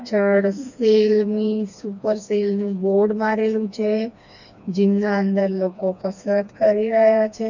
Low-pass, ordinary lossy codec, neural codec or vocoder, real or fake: 7.2 kHz; none; codec, 16 kHz, 2 kbps, FreqCodec, smaller model; fake